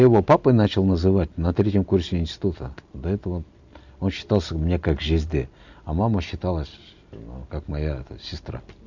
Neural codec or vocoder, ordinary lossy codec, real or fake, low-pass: none; none; real; 7.2 kHz